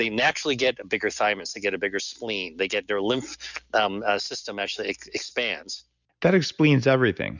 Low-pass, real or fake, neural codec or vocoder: 7.2 kHz; real; none